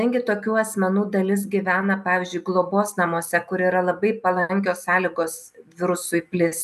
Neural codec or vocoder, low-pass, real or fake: none; 14.4 kHz; real